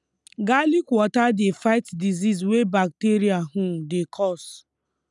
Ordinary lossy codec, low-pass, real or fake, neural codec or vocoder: none; 10.8 kHz; real; none